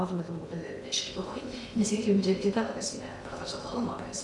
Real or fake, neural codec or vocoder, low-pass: fake; codec, 16 kHz in and 24 kHz out, 0.6 kbps, FocalCodec, streaming, 2048 codes; 10.8 kHz